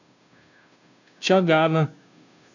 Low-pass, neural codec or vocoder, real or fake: 7.2 kHz; codec, 16 kHz, 0.5 kbps, FunCodec, trained on Chinese and English, 25 frames a second; fake